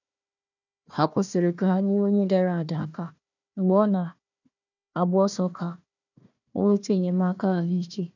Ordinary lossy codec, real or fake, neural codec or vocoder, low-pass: none; fake; codec, 16 kHz, 1 kbps, FunCodec, trained on Chinese and English, 50 frames a second; 7.2 kHz